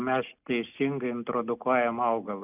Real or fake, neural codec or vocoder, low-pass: real; none; 3.6 kHz